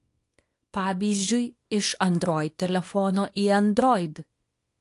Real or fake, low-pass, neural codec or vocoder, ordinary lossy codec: fake; 10.8 kHz; codec, 24 kHz, 0.9 kbps, WavTokenizer, small release; AAC, 48 kbps